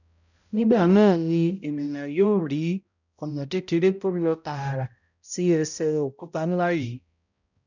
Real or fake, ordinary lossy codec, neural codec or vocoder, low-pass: fake; none; codec, 16 kHz, 0.5 kbps, X-Codec, HuBERT features, trained on balanced general audio; 7.2 kHz